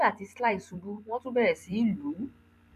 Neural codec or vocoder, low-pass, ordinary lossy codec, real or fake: vocoder, 44.1 kHz, 128 mel bands every 256 samples, BigVGAN v2; 14.4 kHz; none; fake